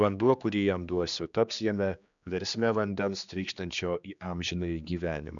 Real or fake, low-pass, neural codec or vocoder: fake; 7.2 kHz; codec, 16 kHz, 2 kbps, X-Codec, HuBERT features, trained on general audio